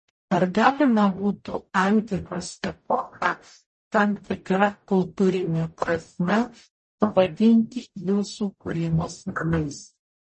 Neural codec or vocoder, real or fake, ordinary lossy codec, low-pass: codec, 44.1 kHz, 0.9 kbps, DAC; fake; MP3, 32 kbps; 10.8 kHz